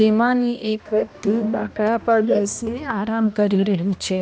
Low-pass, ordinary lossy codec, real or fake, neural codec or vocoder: none; none; fake; codec, 16 kHz, 1 kbps, X-Codec, HuBERT features, trained on balanced general audio